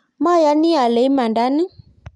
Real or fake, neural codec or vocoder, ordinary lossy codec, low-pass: real; none; none; 10.8 kHz